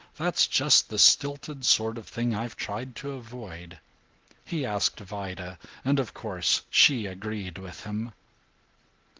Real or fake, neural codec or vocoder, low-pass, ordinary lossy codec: real; none; 7.2 kHz; Opus, 16 kbps